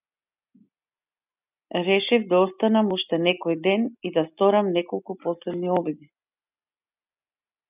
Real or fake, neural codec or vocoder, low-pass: real; none; 3.6 kHz